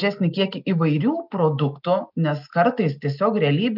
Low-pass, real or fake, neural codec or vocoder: 5.4 kHz; real; none